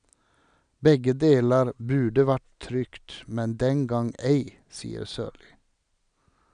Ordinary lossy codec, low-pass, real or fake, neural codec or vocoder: none; 9.9 kHz; real; none